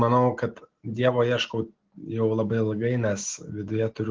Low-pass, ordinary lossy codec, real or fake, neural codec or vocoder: 7.2 kHz; Opus, 16 kbps; real; none